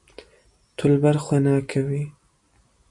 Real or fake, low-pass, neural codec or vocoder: real; 10.8 kHz; none